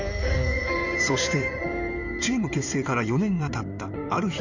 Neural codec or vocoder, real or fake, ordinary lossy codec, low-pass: codec, 16 kHz in and 24 kHz out, 2.2 kbps, FireRedTTS-2 codec; fake; none; 7.2 kHz